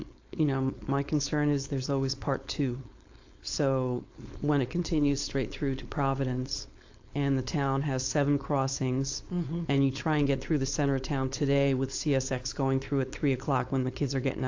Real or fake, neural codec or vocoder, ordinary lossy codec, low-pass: fake; codec, 16 kHz, 4.8 kbps, FACodec; AAC, 48 kbps; 7.2 kHz